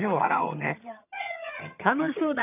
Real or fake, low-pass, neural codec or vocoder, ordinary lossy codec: fake; 3.6 kHz; vocoder, 22.05 kHz, 80 mel bands, HiFi-GAN; none